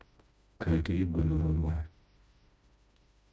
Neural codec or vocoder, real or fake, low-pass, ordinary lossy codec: codec, 16 kHz, 1 kbps, FreqCodec, smaller model; fake; none; none